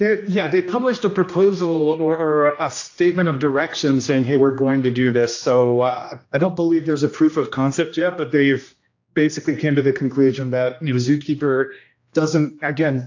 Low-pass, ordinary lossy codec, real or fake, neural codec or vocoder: 7.2 kHz; AAC, 48 kbps; fake; codec, 16 kHz, 1 kbps, X-Codec, HuBERT features, trained on general audio